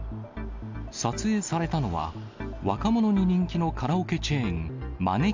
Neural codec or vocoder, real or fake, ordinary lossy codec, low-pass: none; real; MP3, 64 kbps; 7.2 kHz